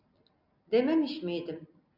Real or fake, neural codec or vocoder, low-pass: real; none; 5.4 kHz